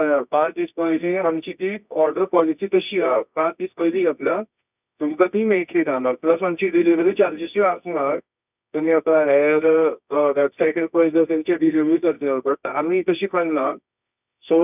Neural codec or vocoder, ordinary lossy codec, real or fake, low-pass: codec, 24 kHz, 0.9 kbps, WavTokenizer, medium music audio release; none; fake; 3.6 kHz